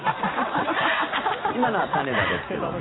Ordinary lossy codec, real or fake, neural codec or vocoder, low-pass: AAC, 16 kbps; real; none; 7.2 kHz